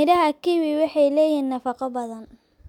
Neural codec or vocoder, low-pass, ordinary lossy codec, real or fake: none; 19.8 kHz; none; real